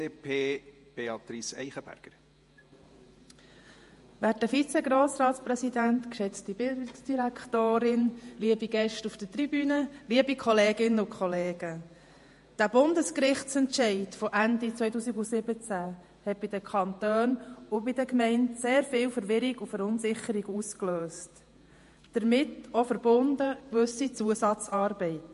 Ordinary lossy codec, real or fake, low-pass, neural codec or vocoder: MP3, 48 kbps; fake; 14.4 kHz; vocoder, 48 kHz, 128 mel bands, Vocos